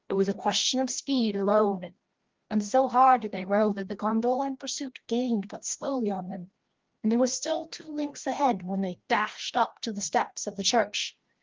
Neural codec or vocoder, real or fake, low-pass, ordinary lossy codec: codec, 16 kHz, 1 kbps, FreqCodec, larger model; fake; 7.2 kHz; Opus, 16 kbps